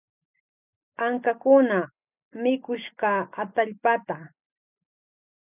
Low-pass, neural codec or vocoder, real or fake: 3.6 kHz; none; real